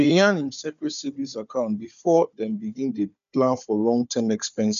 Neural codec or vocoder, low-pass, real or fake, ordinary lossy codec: codec, 16 kHz, 4 kbps, FunCodec, trained on Chinese and English, 50 frames a second; 7.2 kHz; fake; AAC, 96 kbps